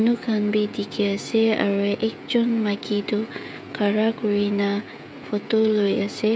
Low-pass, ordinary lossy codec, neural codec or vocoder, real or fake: none; none; codec, 16 kHz, 16 kbps, FreqCodec, smaller model; fake